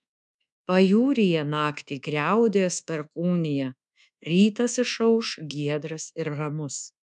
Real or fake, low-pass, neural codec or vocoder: fake; 10.8 kHz; codec, 24 kHz, 1.2 kbps, DualCodec